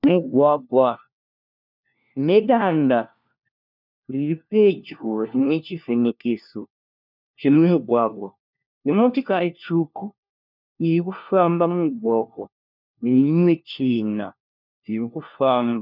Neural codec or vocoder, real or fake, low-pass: codec, 16 kHz, 1 kbps, FunCodec, trained on LibriTTS, 50 frames a second; fake; 5.4 kHz